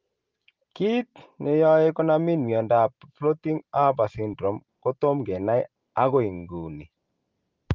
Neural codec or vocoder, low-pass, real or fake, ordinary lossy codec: none; 7.2 kHz; real; Opus, 32 kbps